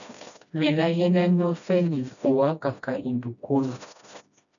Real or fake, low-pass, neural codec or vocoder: fake; 7.2 kHz; codec, 16 kHz, 1 kbps, FreqCodec, smaller model